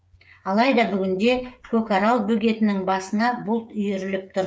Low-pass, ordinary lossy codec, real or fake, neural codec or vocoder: none; none; fake; codec, 16 kHz, 8 kbps, FreqCodec, smaller model